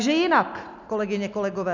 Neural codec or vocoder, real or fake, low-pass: none; real; 7.2 kHz